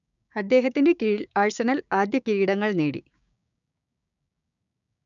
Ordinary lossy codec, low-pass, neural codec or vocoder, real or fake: none; 7.2 kHz; codec, 16 kHz, 6 kbps, DAC; fake